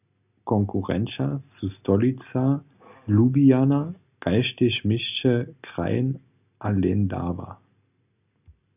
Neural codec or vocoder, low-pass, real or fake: none; 3.6 kHz; real